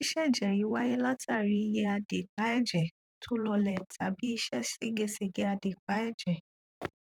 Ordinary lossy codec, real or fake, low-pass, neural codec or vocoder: none; fake; 19.8 kHz; vocoder, 44.1 kHz, 128 mel bands, Pupu-Vocoder